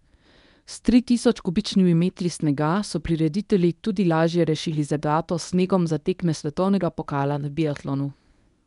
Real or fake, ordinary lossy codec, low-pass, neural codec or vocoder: fake; none; 10.8 kHz; codec, 24 kHz, 0.9 kbps, WavTokenizer, medium speech release version 1